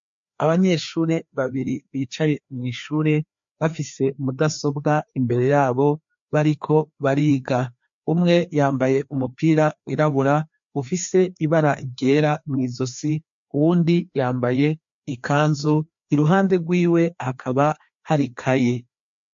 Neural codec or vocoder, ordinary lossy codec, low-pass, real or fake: codec, 16 kHz, 2 kbps, FreqCodec, larger model; MP3, 48 kbps; 7.2 kHz; fake